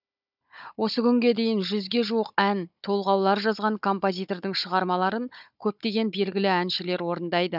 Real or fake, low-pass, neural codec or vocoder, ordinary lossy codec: fake; 5.4 kHz; codec, 16 kHz, 16 kbps, FunCodec, trained on Chinese and English, 50 frames a second; none